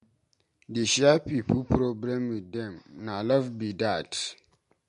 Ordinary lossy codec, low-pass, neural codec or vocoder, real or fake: MP3, 48 kbps; 14.4 kHz; none; real